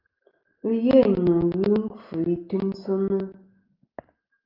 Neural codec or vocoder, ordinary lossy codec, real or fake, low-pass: none; Opus, 32 kbps; real; 5.4 kHz